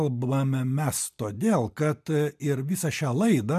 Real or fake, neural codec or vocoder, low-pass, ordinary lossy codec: real; none; 14.4 kHz; MP3, 96 kbps